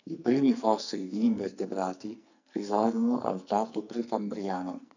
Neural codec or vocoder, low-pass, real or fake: codec, 44.1 kHz, 2.6 kbps, SNAC; 7.2 kHz; fake